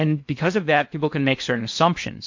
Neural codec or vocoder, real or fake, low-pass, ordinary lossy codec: codec, 16 kHz in and 24 kHz out, 0.8 kbps, FocalCodec, streaming, 65536 codes; fake; 7.2 kHz; MP3, 48 kbps